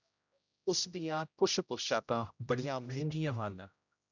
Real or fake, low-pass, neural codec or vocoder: fake; 7.2 kHz; codec, 16 kHz, 0.5 kbps, X-Codec, HuBERT features, trained on general audio